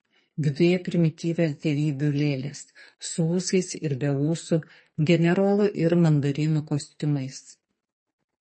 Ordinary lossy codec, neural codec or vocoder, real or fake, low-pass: MP3, 32 kbps; codec, 44.1 kHz, 2.6 kbps, SNAC; fake; 9.9 kHz